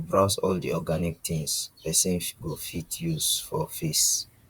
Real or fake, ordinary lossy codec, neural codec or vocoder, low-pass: fake; Opus, 64 kbps; vocoder, 44.1 kHz, 128 mel bands, Pupu-Vocoder; 19.8 kHz